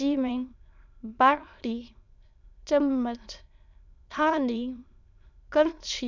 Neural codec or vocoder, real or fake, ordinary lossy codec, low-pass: autoencoder, 22.05 kHz, a latent of 192 numbers a frame, VITS, trained on many speakers; fake; MP3, 64 kbps; 7.2 kHz